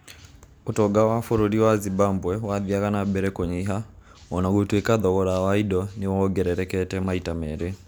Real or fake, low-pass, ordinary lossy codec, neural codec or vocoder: real; none; none; none